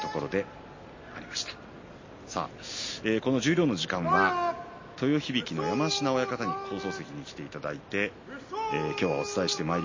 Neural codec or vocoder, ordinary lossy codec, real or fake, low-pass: none; MP3, 32 kbps; real; 7.2 kHz